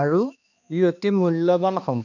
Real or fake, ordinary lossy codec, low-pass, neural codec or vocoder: fake; none; 7.2 kHz; codec, 16 kHz, 2 kbps, X-Codec, HuBERT features, trained on balanced general audio